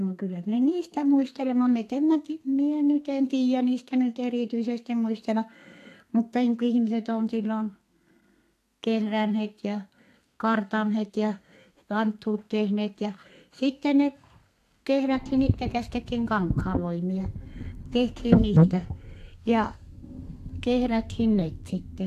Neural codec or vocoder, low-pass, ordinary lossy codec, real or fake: codec, 32 kHz, 1.9 kbps, SNAC; 14.4 kHz; AAC, 64 kbps; fake